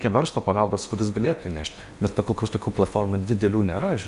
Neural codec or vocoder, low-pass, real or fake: codec, 16 kHz in and 24 kHz out, 0.8 kbps, FocalCodec, streaming, 65536 codes; 10.8 kHz; fake